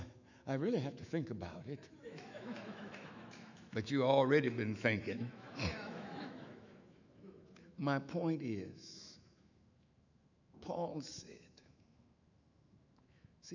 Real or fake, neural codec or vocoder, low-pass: fake; autoencoder, 48 kHz, 128 numbers a frame, DAC-VAE, trained on Japanese speech; 7.2 kHz